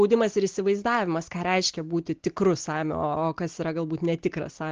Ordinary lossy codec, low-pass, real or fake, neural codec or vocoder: Opus, 16 kbps; 7.2 kHz; real; none